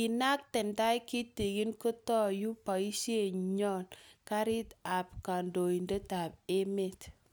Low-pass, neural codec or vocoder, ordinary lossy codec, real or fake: none; none; none; real